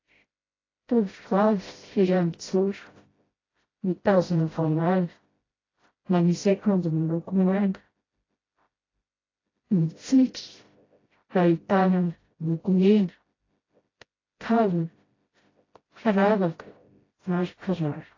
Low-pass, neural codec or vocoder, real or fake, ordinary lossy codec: 7.2 kHz; codec, 16 kHz, 0.5 kbps, FreqCodec, smaller model; fake; AAC, 32 kbps